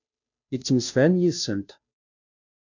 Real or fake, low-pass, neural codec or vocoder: fake; 7.2 kHz; codec, 16 kHz, 0.5 kbps, FunCodec, trained on Chinese and English, 25 frames a second